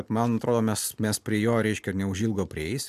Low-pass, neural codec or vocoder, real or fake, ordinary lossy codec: 14.4 kHz; none; real; MP3, 96 kbps